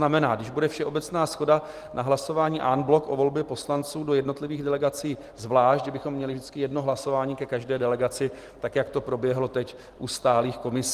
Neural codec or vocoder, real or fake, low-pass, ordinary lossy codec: none; real; 14.4 kHz; Opus, 24 kbps